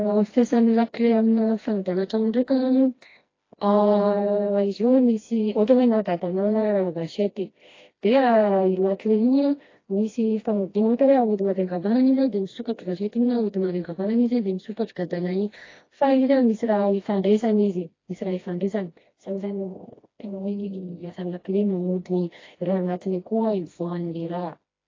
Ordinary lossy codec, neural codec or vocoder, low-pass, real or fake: AAC, 32 kbps; codec, 16 kHz, 1 kbps, FreqCodec, smaller model; 7.2 kHz; fake